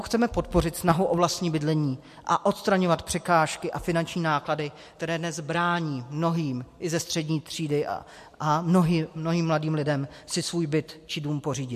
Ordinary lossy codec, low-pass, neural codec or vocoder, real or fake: MP3, 64 kbps; 14.4 kHz; none; real